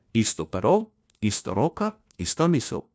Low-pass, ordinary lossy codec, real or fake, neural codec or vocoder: none; none; fake; codec, 16 kHz, 1 kbps, FunCodec, trained on LibriTTS, 50 frames a second